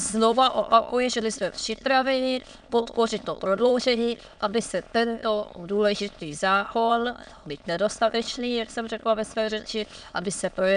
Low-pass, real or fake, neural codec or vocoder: 9.9 kHz; fake; autoencoder, 22.05 kHz, a latent of 192 numbers a frame, VITS, trained on many speakers